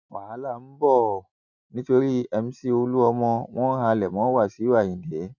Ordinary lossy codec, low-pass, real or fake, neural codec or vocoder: none; 7.2 kHz; real; none